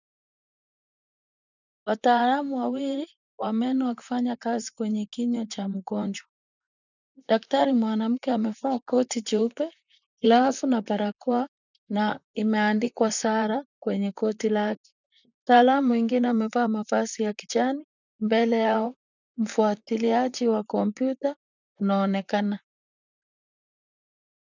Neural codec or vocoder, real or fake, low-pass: vocoder, 44.1 kHz, 128 mel bands, Pupu-Vocoder; fake; 7.2 kHz